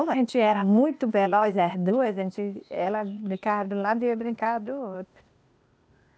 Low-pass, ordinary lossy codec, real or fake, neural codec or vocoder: none; none; fake; codec, 16 kHz, 0.8 kbps, ZipCodec